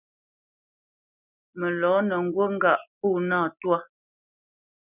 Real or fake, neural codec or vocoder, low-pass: real; none; 3.6 kHz